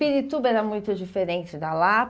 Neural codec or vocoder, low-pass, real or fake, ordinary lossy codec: none; none; real; none